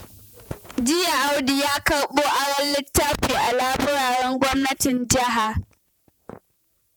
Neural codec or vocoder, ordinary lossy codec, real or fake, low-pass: vocoder, 48 kHz, 128 mel bands, Vocos; none; fake; none